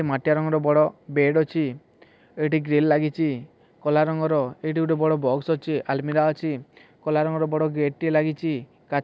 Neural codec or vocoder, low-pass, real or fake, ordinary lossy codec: none; none; real; none